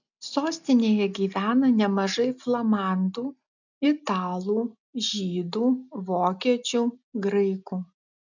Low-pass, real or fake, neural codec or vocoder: 7.2 kHz; real; none